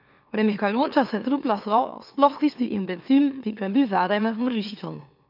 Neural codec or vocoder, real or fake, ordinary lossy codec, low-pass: autoencoder, 44.1 kHz, a latent of 192 numbers a frame, MeloTTS; fake; none; 5.4 kHz